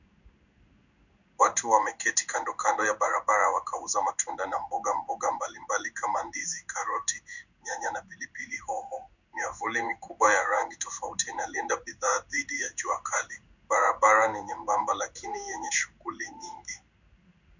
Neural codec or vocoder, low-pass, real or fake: codec, 16 kHz in and 24 kHz out, 1 kbps, XY-Tokenizer; 7.2 kHz; fake